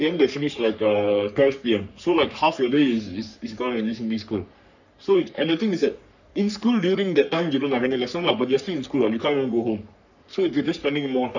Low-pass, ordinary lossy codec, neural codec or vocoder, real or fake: 7.2 kHz; none; codec, 44.1 kHz, 3.4 kbps, Pupu-Codec; fake